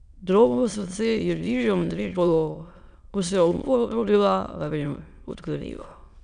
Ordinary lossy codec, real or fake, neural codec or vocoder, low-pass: none; fake; autoencoder, 22.05 kHz, a latent of 192 numbers a frame, VITS, trained on many speakers; 9.9 kHz